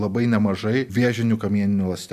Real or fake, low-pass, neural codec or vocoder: real; 14.4 kHz; none